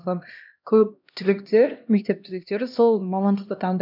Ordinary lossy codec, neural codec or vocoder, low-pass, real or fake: none; codec, 16 kHz, 1 kbps, X-Codec, HuBERT features, trained on LibriSpeech; 5.4 kHz; fake